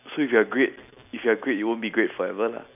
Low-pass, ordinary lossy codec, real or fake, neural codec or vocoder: 3.6 kHz; none; real; none